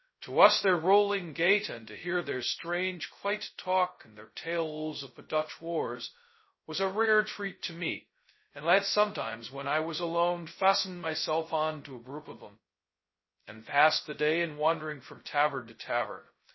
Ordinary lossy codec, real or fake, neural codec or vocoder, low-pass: MP3, 24 kbps; fake; codec, 16 kHz, 0.2 kbps, FocalCodec; 7.2 kHz